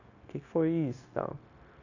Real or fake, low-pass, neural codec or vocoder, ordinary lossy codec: fake; 7.2 kHz; codec, 16 kHz, 0.9 kbps, LongCat-Audio-Codec; none